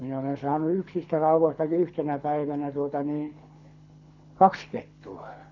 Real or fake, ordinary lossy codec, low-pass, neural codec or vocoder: fake; none; 7.2 kHz; codec, 24 kHz, 6 kbps, HILCodec